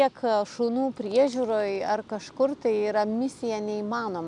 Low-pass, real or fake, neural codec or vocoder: 10.8 kHz; real; none